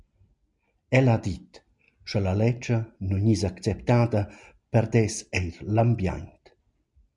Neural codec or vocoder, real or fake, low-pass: none; real; 10.8 kHz